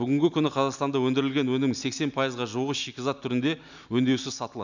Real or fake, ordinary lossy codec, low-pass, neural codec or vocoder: real; none; 7.2 kHz; none